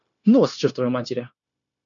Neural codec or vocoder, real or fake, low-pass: codec, 16 kHz, 0.9 kbps, LongCat-Audio-Codec; fake; 7.2 kHz